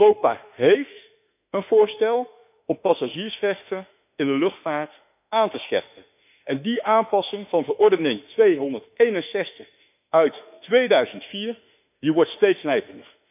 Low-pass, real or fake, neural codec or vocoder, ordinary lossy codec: 3.6 kHz; fake; autoencoder, 48 kHz, 32 numbers a frame, DAC-VAE, trained on Japanese speech; none